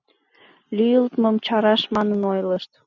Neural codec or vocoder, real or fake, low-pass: none; real; 7.2 kHz